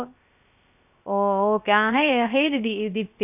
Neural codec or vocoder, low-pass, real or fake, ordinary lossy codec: codec, 16 kHz, 0.3 kbps, FocalCodec; 3.6 kHz; fake; none